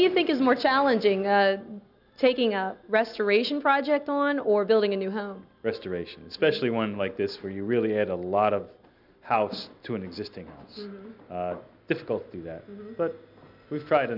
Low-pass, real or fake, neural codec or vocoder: 5.4 kHz; real; none